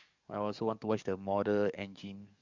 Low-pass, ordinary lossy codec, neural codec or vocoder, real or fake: 7.2 kHz; Opus, 64 kbps; codec, 44.1 kHz, 7.8 kbps, DAC; fake